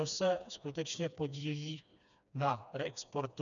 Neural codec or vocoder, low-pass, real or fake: codec, 16 kHz, 2 kbps, FreqCodec, smaller model; 7.2 kHz; fake